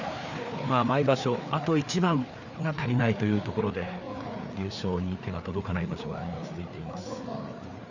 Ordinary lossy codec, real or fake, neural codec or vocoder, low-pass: none; fake; codec, 16 kHz, 4 kbps, FreqCodec, larger model; 7.2 kHz